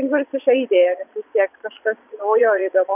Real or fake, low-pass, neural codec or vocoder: fake; 3.6 kHz; vocoder, 22.05 kHz, 80 mel bands, Vocos